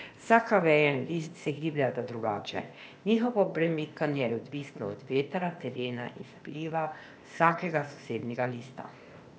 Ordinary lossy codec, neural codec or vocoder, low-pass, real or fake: none; codec, 16 kHz, 0.8 kbps, ZipCodec; none; fake